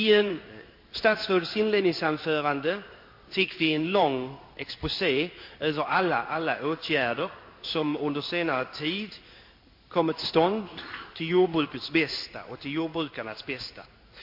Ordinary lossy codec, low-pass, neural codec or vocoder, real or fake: MP3, 32 kbps; 5.4 kHz; codec, 16 kHz in and 24 kHz out, 1 kbps, XY-Tokenizer; fake